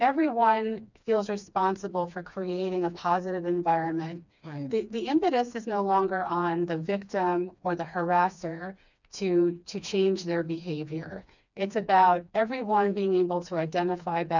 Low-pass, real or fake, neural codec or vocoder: 7.2 kHz; fake; codec, 16 kHz, 2 kbps, FreqCodec, smaller model